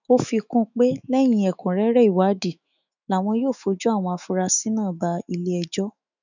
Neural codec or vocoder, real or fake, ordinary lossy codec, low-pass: autoencoder, 48 kHz, 128 numbers a frame, DAC-VAE, trained on Japanese speech; fake; none; 7.2 kHz